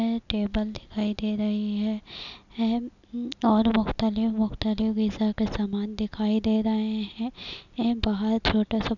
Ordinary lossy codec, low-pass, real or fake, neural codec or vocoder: none; 7.2 kHz; real; none